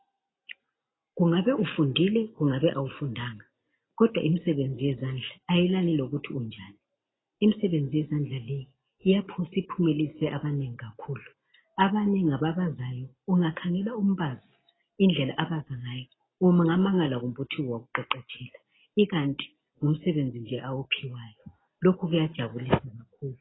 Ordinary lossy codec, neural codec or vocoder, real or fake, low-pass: AAC, 16 kbps; none; real; 7.2 kHz